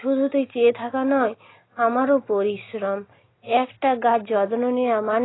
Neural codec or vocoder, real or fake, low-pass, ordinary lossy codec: none; real; 7.2 kHz; AAC, 16 kbps